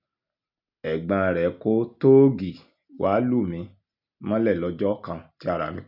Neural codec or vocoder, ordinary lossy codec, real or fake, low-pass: none; none; real; 5.4 kHz